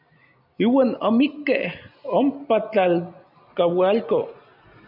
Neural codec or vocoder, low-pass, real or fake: none; 5.4 kHz; real